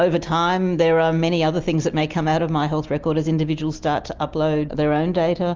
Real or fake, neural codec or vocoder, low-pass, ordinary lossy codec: real; none; 7.2 kHz; Opus, 32 kbps